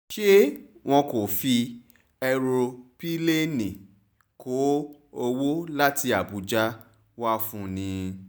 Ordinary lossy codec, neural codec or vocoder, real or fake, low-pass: none; none; real; none